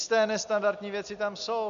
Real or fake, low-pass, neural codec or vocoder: real; 7.2 kHz; none